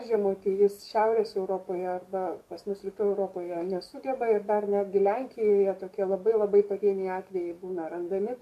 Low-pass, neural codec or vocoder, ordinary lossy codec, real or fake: 14.4 kHz; codec, 44.1 kHz, 7.8 kbps, DAC; MP3, 64 kbps; fake